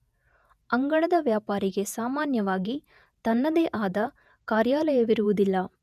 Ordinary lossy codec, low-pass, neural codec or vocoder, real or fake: none; 14.4 kHz; vocoder, 48 kHz, 128 mel bands, Vocos; fake